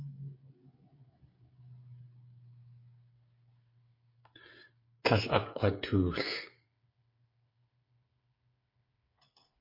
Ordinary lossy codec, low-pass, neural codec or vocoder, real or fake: MP3, 32 kbps; 5.4 kHz; codec, 16 kHz, 8 kbps, FreqCodec, smaller model; fake